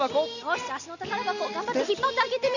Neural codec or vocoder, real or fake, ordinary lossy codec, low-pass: none; real; none; 7.2 kHz